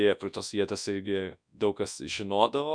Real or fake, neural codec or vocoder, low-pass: fake; codec, 24 kHz, 0.9 kbps, WavTokenizer, large speech release; 9.9 kHz